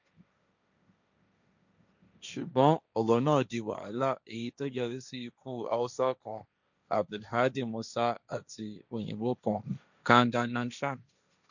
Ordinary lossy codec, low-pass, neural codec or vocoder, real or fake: none; 7.2 kHz; codec, 16 kHz, 1.1 kbps, Voila-Tokenizer; fake